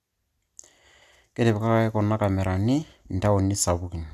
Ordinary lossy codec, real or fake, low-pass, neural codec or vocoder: none; real; 14.4 kHz; none